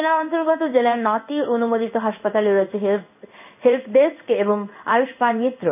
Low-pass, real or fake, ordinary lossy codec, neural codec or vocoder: 3.6 kHz; fake; none; codec, 16 kHz in and 24 kHz out, 1 kbps, XY-Tokenizer